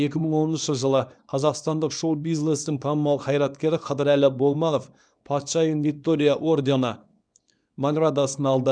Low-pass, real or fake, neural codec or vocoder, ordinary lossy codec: 9.9 kHz; fake; codec, 24 kHz, 0.9 kbps, WavTokenizer, small release; none